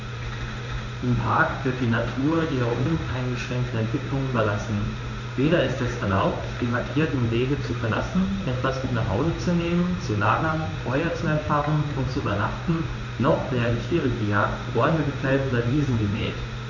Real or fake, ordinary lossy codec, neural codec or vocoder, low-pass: fake; none; codec, 16 kHz in and 24 kHz out, 1 kbps, XY-Tokenizer; 7.2 kHz